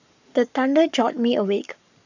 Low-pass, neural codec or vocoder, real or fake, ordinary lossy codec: 7.2 kHz; codec, 44.1 kHz, 7.8 kbps, Pupu-Codec; fake; none